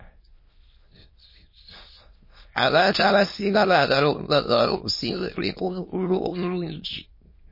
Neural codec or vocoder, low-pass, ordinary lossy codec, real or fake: autoencoder, 22.05 kHz, a latent of 192 numbers a frame, VITS, trained on many speakers; 5.4 kHz; MP3, 24 kbps; fake